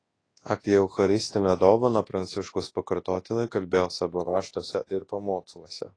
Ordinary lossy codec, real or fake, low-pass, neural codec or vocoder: AAC, 32 kbps; fake; 9.9 kHz; codec, 24 kHz, 0.9 kbps, WavTokenizer, large speech release